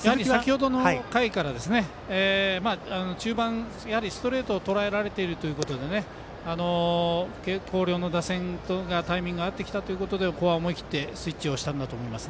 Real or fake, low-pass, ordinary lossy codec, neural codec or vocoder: real; none; none; none